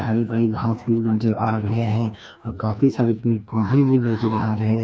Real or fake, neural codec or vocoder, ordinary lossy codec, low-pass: fake; codec, 16 kHz, 1 kbps, FreqCodec, larger model; none; none